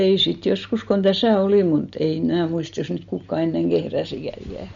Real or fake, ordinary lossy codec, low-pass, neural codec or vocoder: real; MP3, 48 kbps; 7.2 kHz; none